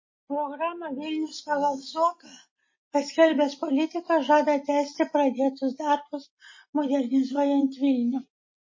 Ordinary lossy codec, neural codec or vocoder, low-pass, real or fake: MP3, 32 kbps; none; 7.2 kHz; real